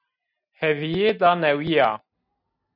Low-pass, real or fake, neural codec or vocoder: 5.4 kHz; real; none